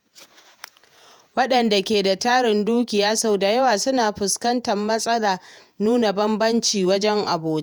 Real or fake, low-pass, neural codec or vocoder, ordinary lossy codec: fake; none; vocoder, 48 kHz, 128 mel bands, Vocos; none